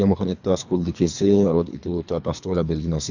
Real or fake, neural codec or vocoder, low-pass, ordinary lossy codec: fake; codec, 24 kHz, 3 kbps, HILCodec; 7.2 kHz; none